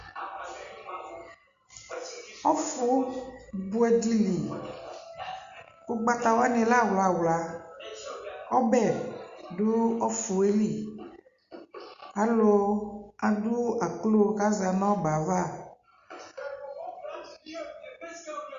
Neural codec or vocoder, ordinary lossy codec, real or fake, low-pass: none; Opus, 64 kbps; real; 7.2 kHz